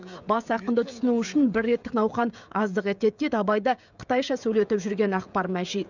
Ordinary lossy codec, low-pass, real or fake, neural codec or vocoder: none; 7.2 kHz; fake; codec, 16 kHz, 16 kbps, FreqCodec, smaller model